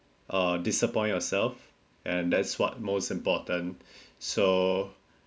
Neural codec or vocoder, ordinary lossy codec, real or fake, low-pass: none; none; real; none